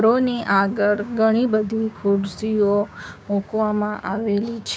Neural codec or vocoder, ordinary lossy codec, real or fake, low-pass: codec, 16 kHz, 6 kbps, DAC; none; fake; none